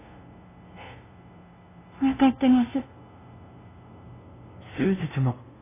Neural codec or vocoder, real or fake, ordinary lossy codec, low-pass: codec, 16 kHz, 0.5 kbps, FunCodec, trained on LibriTTS, 25 frames a second; fake; AAC, 16 kbps; 3.6 kHz